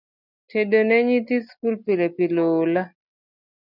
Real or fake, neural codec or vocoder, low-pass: real; none; 5.4 kHz